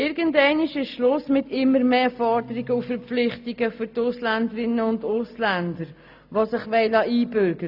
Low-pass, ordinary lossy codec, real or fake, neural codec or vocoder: 5.4 kHz; AAC, 48 kbps; real; none